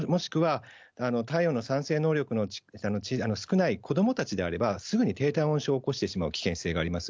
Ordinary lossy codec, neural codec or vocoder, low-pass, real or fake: none; none; none; real